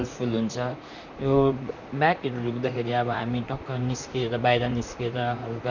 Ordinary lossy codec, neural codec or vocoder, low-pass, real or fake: none; vocoder, 44.1 kHz, 128 mel bands, Pupu-Vocoder; 7.2 kHz; fake